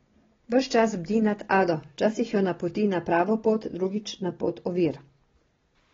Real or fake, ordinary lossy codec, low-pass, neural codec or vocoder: real; AAC, 24 kbps; 7.2 kHz; none